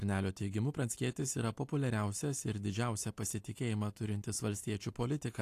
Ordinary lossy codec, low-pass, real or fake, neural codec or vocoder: AAC, 64 kbps; 14.4 kHz; real; none